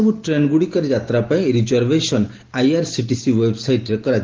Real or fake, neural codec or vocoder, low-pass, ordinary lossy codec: real; none; 7.2 kHz; Opus, 16 kbps